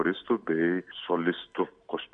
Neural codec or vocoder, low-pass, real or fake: none; 9.9 kHz; real